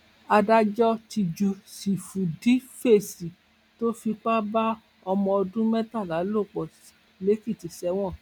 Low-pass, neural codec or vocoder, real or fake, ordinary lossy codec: 19.8 kHz; none; real; none